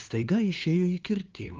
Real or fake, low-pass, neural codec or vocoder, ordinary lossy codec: fake; 7.2 kHz; codec, 16 kHz, 2 kbps, FunCodec, trained on LibriTTS, 25 frames a second; Opus, 24 kbps